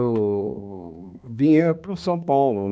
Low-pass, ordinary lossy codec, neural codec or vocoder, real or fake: none; none; codec, 16 kHz, 2 kbps, X-Codec, HuBERT features, trained on balanced general audio; fake